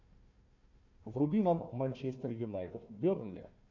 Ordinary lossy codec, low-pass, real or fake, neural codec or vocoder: MP3, 48 kbps; 7.2 kHz; fake; codec, 16 kHz, 1 kbps, FunCodec, trained on Chinese and English, 50 frames a second